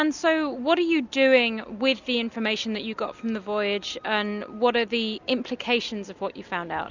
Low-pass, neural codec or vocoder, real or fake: 7.2 kHz; none; real